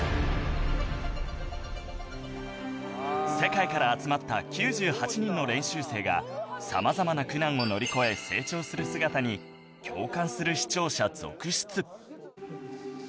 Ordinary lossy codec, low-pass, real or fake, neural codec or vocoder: none; none; real; none